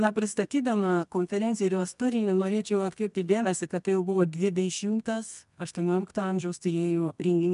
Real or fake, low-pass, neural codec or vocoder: fake; 10.8 kHz; codec, 24 kHz, 0.9 kbps, WavTokenizer, medium music audio release